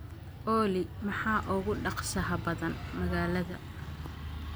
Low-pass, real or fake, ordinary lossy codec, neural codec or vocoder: none; real; none; none